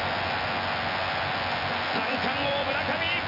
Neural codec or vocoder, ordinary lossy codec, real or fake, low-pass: vocoder, 24 kHz, 100 mel bands, Vocos; none; fake; 5.4 kHz